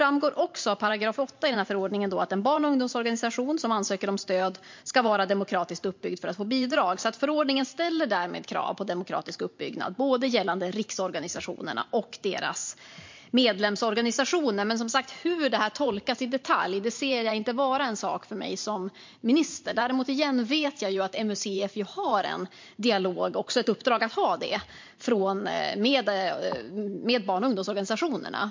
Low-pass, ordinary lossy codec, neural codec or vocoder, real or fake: 7.2 kHz; MP3, 48 kbps; vocoder, 44.1 kHz, 128 mel bands every 512 samples, BigVGAN v2; fake